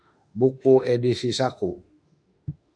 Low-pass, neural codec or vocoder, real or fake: 9.9 kHz; autoencoder, 48 kHz, 32 numbers a frame, DAC-VAE, trained on Japanese speech; fake